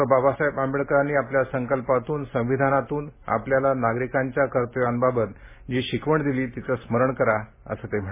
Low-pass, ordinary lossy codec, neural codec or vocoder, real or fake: 3.6 kHz; MP3, 16 kbps; none; real